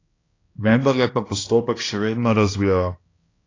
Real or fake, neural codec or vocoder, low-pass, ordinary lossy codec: fake; codec, 16 kHz, 1 kbps, X-Codec, HuBERT features, trained on balanced general audio; 7.2 kHz; AAC, 32 kbps